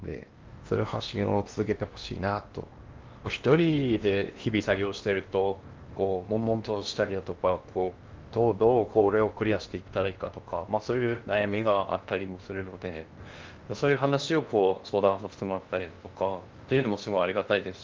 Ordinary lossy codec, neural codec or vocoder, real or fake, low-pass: Opus, 32 kbps; codec, 16 kHz in and 24 kHz out, 0.8 kbps, FocalCodec, streaming, 65536 codes; fake; 7.2 kHz